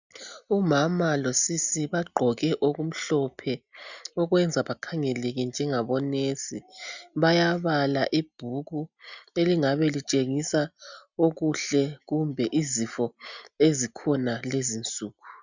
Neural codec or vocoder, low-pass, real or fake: none; 7.2 kHz; real